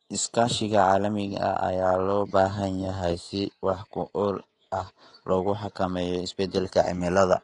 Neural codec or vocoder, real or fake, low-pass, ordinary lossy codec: codec, 24 kHz, 3.1 kbps, DualCodec; fake; 10.8 kHz; AAC, 32 kbps